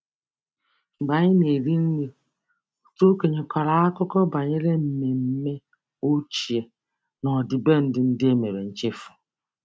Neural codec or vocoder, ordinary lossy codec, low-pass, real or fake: none; none; none; real